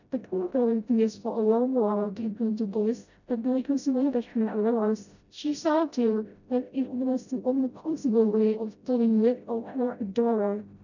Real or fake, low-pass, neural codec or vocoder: fake; 7.2 kHz; codec, 16 kHz, 0.5 kbps, FreqCodec, smaller model